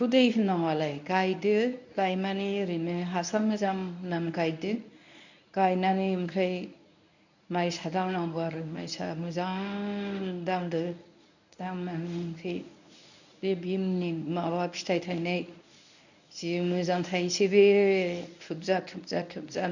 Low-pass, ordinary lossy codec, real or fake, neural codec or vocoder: 7.2 kHz; none; fake; codec, 24 kHz, 0.9 kbps, WavTokenizer, medium speech release version 1